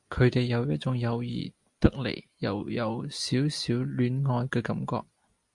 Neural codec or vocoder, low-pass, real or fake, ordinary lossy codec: none; 10.8 kHz; real; Opus, 64 kbps